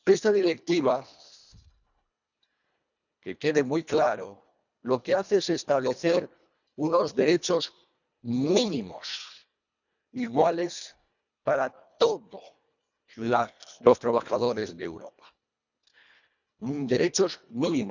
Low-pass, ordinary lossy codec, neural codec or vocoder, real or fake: 7.2 kHz; none; codec, 24 kHz, 1.5 kbps, HILCodec; fake